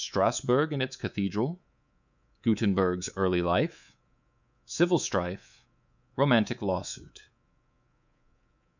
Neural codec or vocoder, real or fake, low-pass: codec, 24 kHz, 3.1 kbps, DualCodec; fake; 7.2 kHz